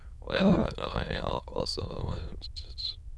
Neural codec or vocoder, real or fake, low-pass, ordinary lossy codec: autoencoder, 22.05 kHz, a latent of 192 numbers a frame, VITS, trained on many speakers; fake; none; none